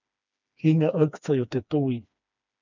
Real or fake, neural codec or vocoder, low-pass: fake; codec, 16 kHz, 2 kbps, FreqCodec, smaller model; 7.2 kHz